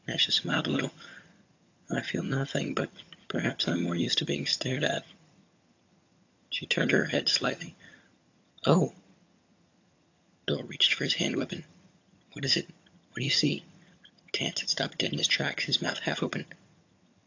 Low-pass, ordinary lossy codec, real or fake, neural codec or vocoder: 7.2 kHz; AAC, 48 kbps; fake; vocoder, 22.05 kHz, 80 mel bands, HiFi-GAN